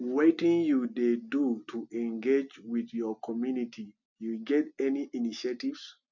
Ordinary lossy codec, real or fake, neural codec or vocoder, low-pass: none; real; none; 7.2 kHz